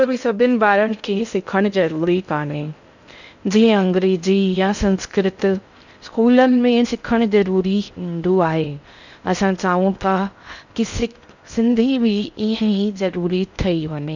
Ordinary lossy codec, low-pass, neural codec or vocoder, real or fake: none; 7.2 kHz; codec, 16 kHz in and 24 kHz out, 0.6 kbps, FocalCodec, streaming, 2048 codes; fake